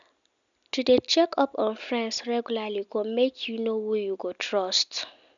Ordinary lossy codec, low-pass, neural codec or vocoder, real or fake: none; 7.2 kHz; none; real